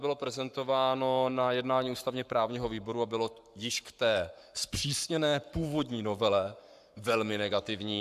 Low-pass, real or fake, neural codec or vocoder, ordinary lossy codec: 14.4 kHz; fake; codec, 44.1 kHz, 7.8 kbps, Pupu-Codec; AAC, 96 kbps